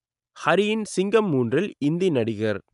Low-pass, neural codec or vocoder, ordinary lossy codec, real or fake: 10.8 kHz; none; none; real